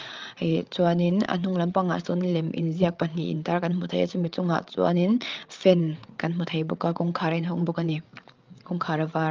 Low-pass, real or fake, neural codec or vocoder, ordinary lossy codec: 7.2 kHz; fake; codec, 16 kHz, 8 kbps, FreqCodec, larger model; Opus, 32 kbps